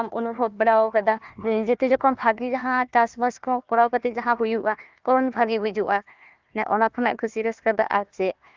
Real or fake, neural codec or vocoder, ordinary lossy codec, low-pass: fake; codec, 16 kHz, 1 kbps, FunCodec, trained on Chinese and English, 50 frames a second; Opus, 24 kbps; 7.2 kHz